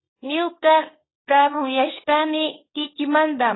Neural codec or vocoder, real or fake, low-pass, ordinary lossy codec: codec, 24 kHz, 0.9 kbps, WavTokenizer, small release; fake; 7.2 kHz; AAC, 16 kbps